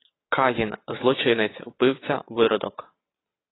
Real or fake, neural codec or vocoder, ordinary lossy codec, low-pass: fake; vocoder, 22.05 kHz, 80 mel bands, WaveNeXt; AAC, 16 kbps; 7.2 kHz